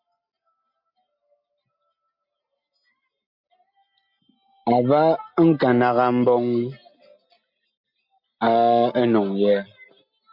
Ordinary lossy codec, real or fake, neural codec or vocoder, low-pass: MP3, 48 kbps; real; none; 5.4 kHz